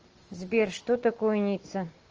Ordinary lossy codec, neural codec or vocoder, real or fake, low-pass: Opus, 24 kbps; none; real; 7.2 kHz